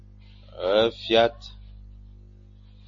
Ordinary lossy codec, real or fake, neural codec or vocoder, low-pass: MP3, 32 kbps; real; none; 7.2 kHz